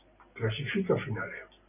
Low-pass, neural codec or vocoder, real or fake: 3.6 kHz; none; real